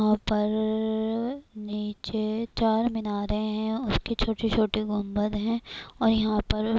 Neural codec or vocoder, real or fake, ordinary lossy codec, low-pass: none; real; none; none